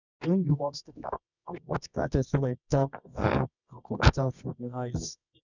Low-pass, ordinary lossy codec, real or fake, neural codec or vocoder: 7.2 kHz; none; fake; codec, 24 kHz, 0.9 kbps, WavTokenizer, medium music audio release